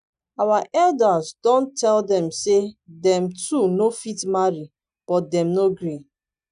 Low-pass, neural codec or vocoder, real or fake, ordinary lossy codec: 9.9 kHz; none; real; none